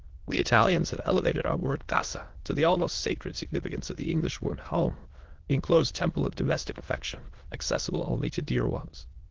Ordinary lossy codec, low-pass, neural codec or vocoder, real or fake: Opus, 16 kbps; 7.2 kHz; autoencoder, 22.05 kHz, a latent of 192 numbers a frame, VITS, trained on many speakers; fake